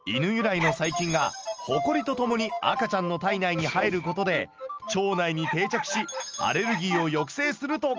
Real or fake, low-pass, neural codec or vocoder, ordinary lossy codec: real; 7.2 kHz; none; Opus, 24 kbps